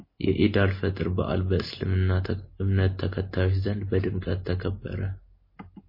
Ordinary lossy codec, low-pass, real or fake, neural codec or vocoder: MP3, 24 kbps; 5.4 kHz; real; none